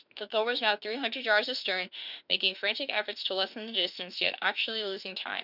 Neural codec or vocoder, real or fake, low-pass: autoencoder, 48 kHz, 32 numbers a frame, DAC-VAE, trained on Japanese speech; fake; 5.4 kHz